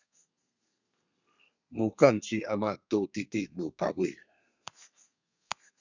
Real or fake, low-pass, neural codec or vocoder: fake; 7.2 kHz; codec, 32 kHz, 1.9 kbps, SNAC